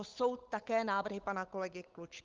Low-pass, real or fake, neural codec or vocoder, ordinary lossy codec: 7.2 kHz; fake; codec, 16 kHz, 8 kbps, FunCodec, trained on Chinese and English, 25 frames a second; Opus, 24 kbps